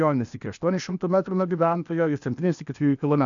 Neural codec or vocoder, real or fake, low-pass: codec, 16 kHz, 0.8 kbps, ZipCodec; fake; 7.2 kHz